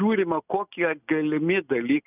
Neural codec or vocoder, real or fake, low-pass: none; real; 3.6 kHz